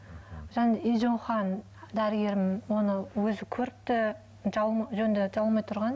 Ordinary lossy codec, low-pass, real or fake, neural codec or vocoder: none; none; real; none